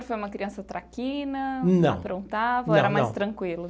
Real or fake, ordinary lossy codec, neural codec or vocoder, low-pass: real; none; none; none